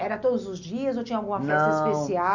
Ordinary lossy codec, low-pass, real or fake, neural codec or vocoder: none; 7.2 kHz; real; none